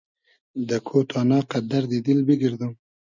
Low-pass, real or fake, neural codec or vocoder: 7.2 kHz; real; none